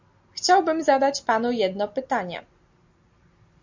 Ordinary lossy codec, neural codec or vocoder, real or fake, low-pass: MP3, 48 kbps; none; real; 7.2 kHz